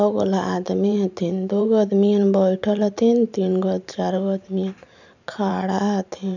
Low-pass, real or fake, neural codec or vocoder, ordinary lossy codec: 7.2 kHz; fake; vocoder, 44.1 kHz, 128 mel bands every 512 samples, BigVGAN v2; none